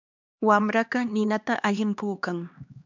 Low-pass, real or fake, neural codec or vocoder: 7.2 kHz; fake; codec, 16 kHz, 2 kbps, X-Codec, HuBERT features, trained on LibriSpeech